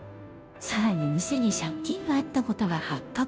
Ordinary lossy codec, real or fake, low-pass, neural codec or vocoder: none; fake; none; codec, 16 kHz, 0.5 kbps, FunCodec, trained on Chinese and English, 25 frames a second